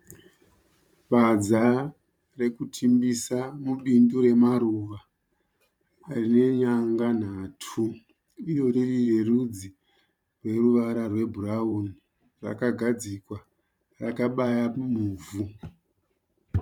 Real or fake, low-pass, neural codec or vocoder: fake; 19.8 kHz; vocoder, 44.1 kHz, 128 mel bands every 512 samples, BigVGAN v2